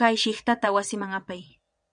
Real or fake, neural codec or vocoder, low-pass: fake; vocoder, 22.05 kHz, 80 mel bands, Vocos; 9.9 kHz